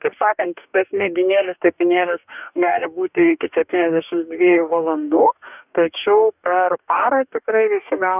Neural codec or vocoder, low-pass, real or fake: codec, 44.1 kHz, 2.6 kbps, DAC; 3.6 kHz; fake